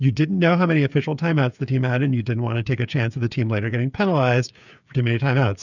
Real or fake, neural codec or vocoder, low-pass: fake; codec, 16 kHz, 8 kbps, FreqCodec, smaller model; 7.2 kHz